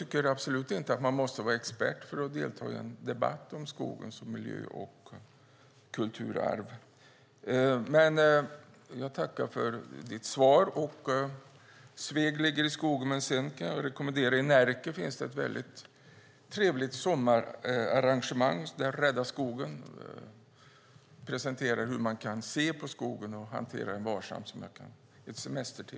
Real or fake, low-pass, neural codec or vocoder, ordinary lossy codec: real; none; none; none